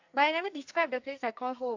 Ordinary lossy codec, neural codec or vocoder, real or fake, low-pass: AAC, 48 kbps; codec, 44.1 kHz, 2.6 kbps, SNAC; fake; 7.2 kHz